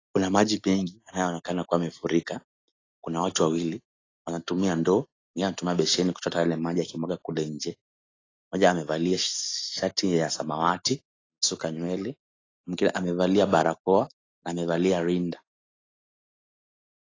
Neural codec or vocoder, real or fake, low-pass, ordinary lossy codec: none; real; 7.2 kHz; AAC, 32 kbps